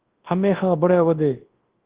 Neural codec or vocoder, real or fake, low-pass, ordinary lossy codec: codec, 24 kHz, 0.9 kbps, WavTokenizer, large speech release; fake; 3.6 kHz; Opus, 16 kbps